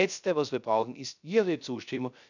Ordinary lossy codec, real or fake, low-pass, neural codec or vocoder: none; fake; 7.2 kHz; codec, 16 kHz, 0.3 kbps, FocalCodec